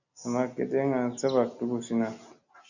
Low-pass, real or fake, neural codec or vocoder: 7.2 kHz; real; none